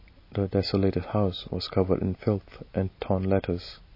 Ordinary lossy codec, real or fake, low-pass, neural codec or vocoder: MP3, 24 kbps; real; 5.4 kHz; none